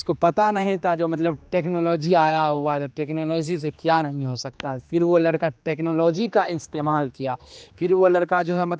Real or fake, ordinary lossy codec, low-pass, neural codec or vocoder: fake; none; none; codec, 16 kHz, 2 kbps, X-Codec, HuBERT features, trained on general audio